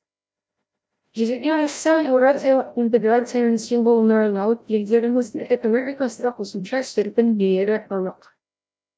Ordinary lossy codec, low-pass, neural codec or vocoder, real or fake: none; none; codec, 16 kHz, 0.5 kbps, FreqCodec, larger model; fake